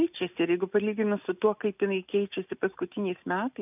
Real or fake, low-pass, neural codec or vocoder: real; 3.6 kHz; none